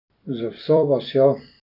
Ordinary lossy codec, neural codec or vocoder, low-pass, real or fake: none; vocoder, 44.1 kHz, 128 mel bands every 256 samples, BigVGAN v2; 5.4 kHz; fake